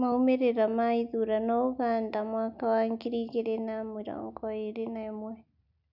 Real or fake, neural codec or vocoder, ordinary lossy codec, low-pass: real; none; none; 5.4 kHz